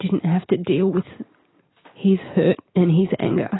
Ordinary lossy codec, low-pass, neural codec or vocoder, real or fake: AAC, 16 kbps; 7.2 kHz; none; real